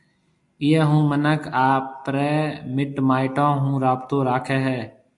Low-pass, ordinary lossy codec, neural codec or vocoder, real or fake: 10.8 kHz; AAC, 64 kbps; none; real